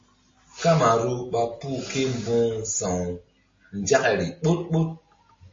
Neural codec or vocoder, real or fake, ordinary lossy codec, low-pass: none; real; MP3, 32 kbps; 7.2 kHz